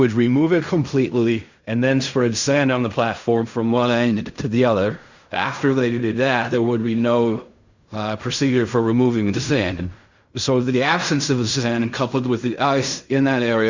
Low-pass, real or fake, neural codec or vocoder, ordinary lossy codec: 7.2 kHz; fake; codec, 16 kHz in and 24 kHz out, 0.4 kbps, LongCat-Audio-Codec, fine tuned four codebook decoder; Opus, 64 kbps